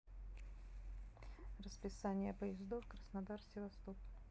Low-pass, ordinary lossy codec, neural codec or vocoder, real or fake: none; none; none; real